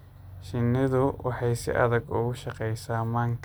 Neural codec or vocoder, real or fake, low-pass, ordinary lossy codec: none; real; none; none